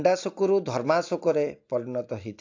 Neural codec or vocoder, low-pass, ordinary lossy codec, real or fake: none; 7.2 kHz; AAC, 48 kbps; real